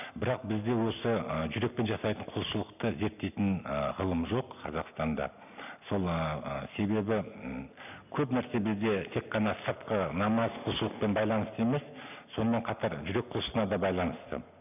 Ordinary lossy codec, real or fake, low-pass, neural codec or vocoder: none; real; 3.6 kHz; none